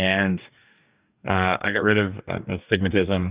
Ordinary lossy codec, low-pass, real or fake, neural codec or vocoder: Opus, 64 kbps; 3.6 kHz; fake; codec, 44.1 kHz, 2.6 kbps, DAC